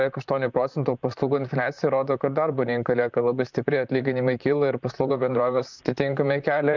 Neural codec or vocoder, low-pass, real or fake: vocoder, 44.1 kHz, 128 mel bands, Pupu-Vocoder; 7.2 kHz; fake